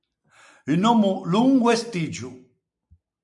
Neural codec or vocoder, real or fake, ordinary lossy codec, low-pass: none; real; MP3, 96 kbps; 10.8 kHz